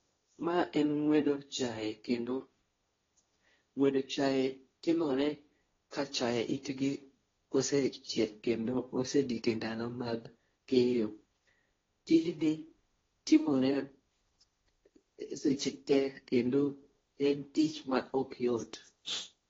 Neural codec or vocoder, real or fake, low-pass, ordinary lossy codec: codec, 16 kHz, 1.1 kbps, Voila-Tokenizer; fake; 7.2 kHz; AAC, 24 kbps